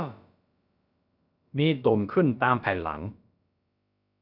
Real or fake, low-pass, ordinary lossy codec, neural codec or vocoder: fake; 5.4 kHz; none; codec, 16 kHz, about 1 kbps, DyCAST, with the encoder's durations